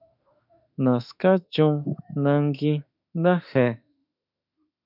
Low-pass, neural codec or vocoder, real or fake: 5.4 kHz; autoencoder, 48 kHz, 32 numbers a frame, DAC-VAE, trained on Japanese speech; fake